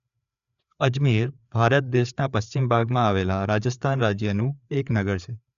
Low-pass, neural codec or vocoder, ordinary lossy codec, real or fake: 7.2 kHz; codec, 16 kHz, 4 kbps, FreqCodec, larger model; none; fake